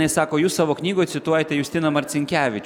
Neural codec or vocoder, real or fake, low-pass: none; real; 19.8 kHz